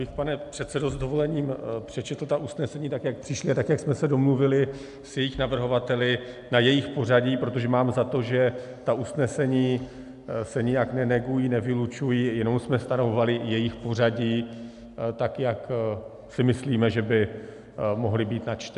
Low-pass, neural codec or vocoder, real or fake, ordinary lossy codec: 10.8 kHz; none; real; AAC, 96 kbps